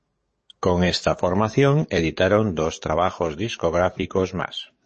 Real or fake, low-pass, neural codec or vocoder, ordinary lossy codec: fake; 10.8 kHz; codec, 44.1 kHz, 7.8 kbps, DAC; MP3, 32 kbps